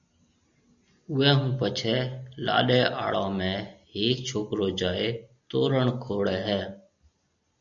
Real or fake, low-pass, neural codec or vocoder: real; 7.2 kHz; none